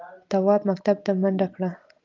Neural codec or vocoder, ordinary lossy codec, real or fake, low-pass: none; Opus, 24 kbps; real; 7.2 kHz